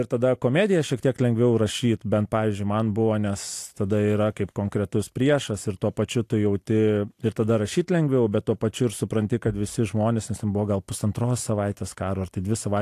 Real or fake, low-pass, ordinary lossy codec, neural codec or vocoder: real; 14.4 kHz; AAC, 64 kbps; none